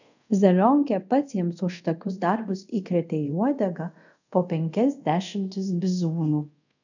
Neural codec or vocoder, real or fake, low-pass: codec, 24 kHz, 0.5 kbps, DualCodec; fake; 7.2 kHz